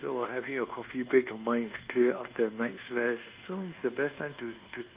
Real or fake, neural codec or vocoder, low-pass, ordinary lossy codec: fake; codec, 24 kHz, 1.2 kbps, DualCodec; 3.6 kHz; Opus, 24 kbps